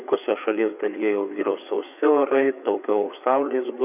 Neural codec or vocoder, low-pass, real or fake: codec, 16 kHz, 4 kbps, FreqCodec, larger model; 3.6 kHz; fake